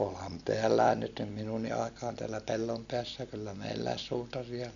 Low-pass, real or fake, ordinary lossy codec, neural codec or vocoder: 7.2 kHz; real; none; none